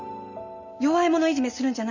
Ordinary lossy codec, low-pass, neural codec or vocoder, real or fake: none; 7.2 kHz; none; real